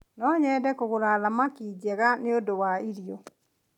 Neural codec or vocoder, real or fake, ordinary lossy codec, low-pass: none; real; none; 19.8 kHz